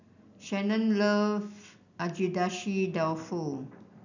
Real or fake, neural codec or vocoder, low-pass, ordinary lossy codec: real; none; 7.2 kHz; none